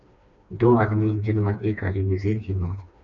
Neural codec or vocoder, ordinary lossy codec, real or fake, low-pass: codec, 16 kHz, 2 kbps, FreqCodec, smaller model; MP3, 96 kbps; fake; 7.2 kHz